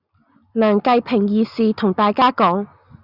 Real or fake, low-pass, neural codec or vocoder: real; 5.4 kHz; none